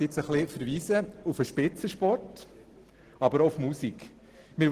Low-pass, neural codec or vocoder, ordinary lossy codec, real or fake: 14.4 kHz; none; Opus, 16 kbps; real